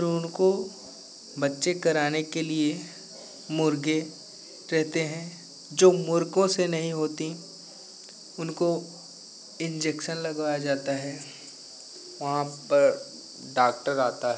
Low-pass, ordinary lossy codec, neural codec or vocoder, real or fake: none; none; none; real